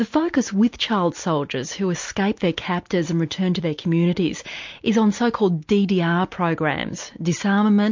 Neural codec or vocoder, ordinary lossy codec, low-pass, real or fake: none; MP3, 48 kbps; 7.2 kHz; real